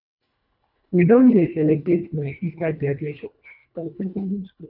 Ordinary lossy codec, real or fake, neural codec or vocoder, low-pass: none; fake; codec, 24 kHz, 1.5 kbps, HILCodec; 5.4 kHz